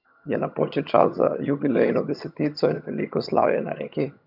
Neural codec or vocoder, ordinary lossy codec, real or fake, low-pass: vocoder, 22.05 kHz, 80 mel bands, HiFi-GAN; none; fake; 5.4 kHz